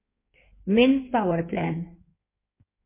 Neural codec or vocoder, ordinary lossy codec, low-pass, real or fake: codec, 16 kHz in and 24 kHz out, 1.1 kbps, FireRedTTS-2 codec; MP3, 24 kbps; 3.6 kHz; fake